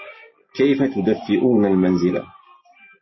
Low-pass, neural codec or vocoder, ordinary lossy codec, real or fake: 7.2 kHz; none; MP3, 24 kbps; real